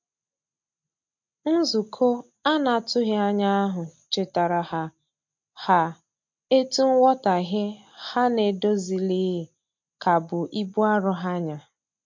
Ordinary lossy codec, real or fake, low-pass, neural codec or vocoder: MP3, 48 kbps; real; 7.2 kHz; none